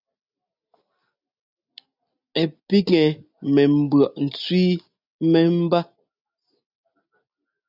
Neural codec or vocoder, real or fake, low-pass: none; real; 5.4 kHz